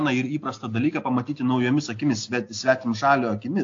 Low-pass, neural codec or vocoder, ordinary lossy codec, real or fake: 7.2 kHz; none; AAC, 48 kbps; real